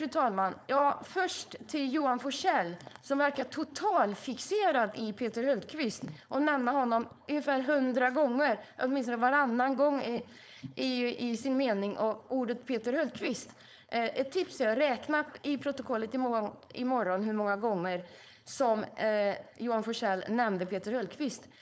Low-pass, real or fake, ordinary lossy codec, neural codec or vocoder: none; fake; none; codec, 16 kHz, 4.8 kbps, FACodec